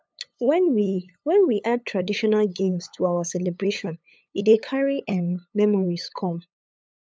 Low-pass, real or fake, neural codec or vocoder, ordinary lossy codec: none; fake; codec, 16 kHz, 8 kbps, FunCodec, trained on LibriTTS, 25 frames a second; none